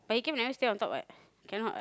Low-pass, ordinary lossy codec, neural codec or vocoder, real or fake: none; none; none; real